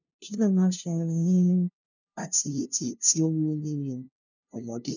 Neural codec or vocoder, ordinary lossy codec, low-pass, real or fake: codec, 16 kHz, 0.5 kbps, FunCodec, trained on LibriTTS, 25 frames a second; none; 7.2 kHz; fake